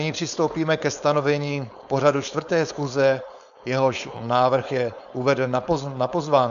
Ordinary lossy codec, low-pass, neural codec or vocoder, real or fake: AAC, 96 kbps; 7.2 kHz; codec, 16 kHz, 4.8 kbps, FACodec; fake